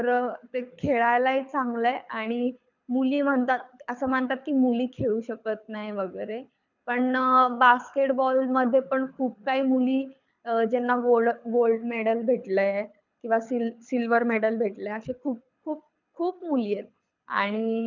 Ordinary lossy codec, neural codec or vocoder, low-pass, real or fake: none; codec, 24 kHz, 6 kbps, HILCodec; 7.2 kHz; fake